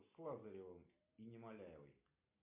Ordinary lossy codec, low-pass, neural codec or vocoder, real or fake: MP3, 32 kbps; 3.6 kHz; none; real